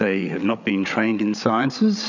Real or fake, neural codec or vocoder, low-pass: fake; codec, 16 kHz, 8 kbps, FreqCodec, larger model; 7.2 kHz